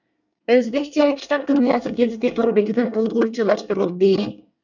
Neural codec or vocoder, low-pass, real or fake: codec, 24 kHz, 1 kbps, SNAC; 7.2 kHz; fake